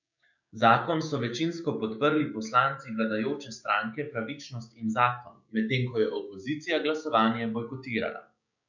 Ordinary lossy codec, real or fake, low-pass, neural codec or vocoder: none; fake; 7.2 kHz; codec, 16 kHz, 6 kbps, DAC